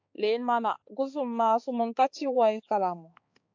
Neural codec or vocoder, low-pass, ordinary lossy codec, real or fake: codec, 16 kHz, 4 kbps, X-Codec, HuBERT features, trained on balanced general audio; 7.2 kHz; AAC, 48 kbps; fake